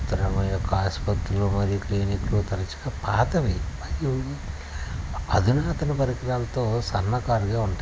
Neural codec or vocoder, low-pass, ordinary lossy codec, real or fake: none; none; none; real